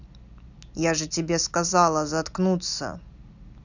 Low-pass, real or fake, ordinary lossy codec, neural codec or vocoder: 7.2 kHz; real; none; none